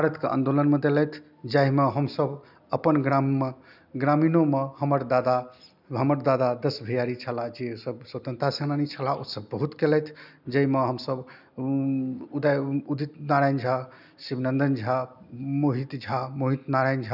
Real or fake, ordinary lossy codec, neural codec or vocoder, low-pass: real; none; none; 5.4 kHz